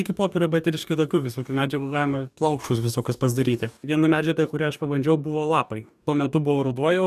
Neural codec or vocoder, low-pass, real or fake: codec, 44.1 kHz, 2.6 kbps, DAC; 14.4 kHz; fake